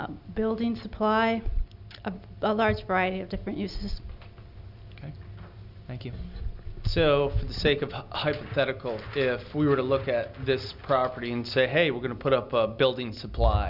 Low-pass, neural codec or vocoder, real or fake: 5.4 kHz; none; real